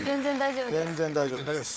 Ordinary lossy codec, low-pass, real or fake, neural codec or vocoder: none; none; fake; codec, 16 kHz, 4 kbps, FunCodec, trained on Chinese and English, 50 frames a second